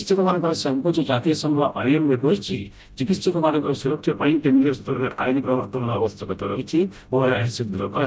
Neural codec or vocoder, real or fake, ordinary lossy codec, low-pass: codec, 16 kHz, 0.5 kbps, FreqCodec, smaller model; fake; none; none